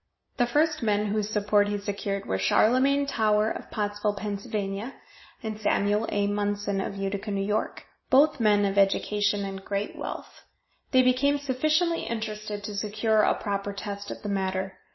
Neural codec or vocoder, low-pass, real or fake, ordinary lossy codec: none; 7.2 kHz; real; MP3, 24 kbps